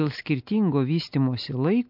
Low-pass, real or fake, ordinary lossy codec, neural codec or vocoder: 5.4 kHz; real; MP3, 48 kbps; none